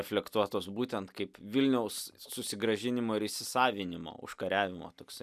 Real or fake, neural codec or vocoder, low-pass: real; none; 14.4 kHz